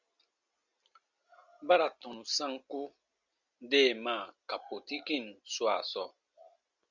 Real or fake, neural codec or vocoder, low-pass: real; none; 7.2 kHz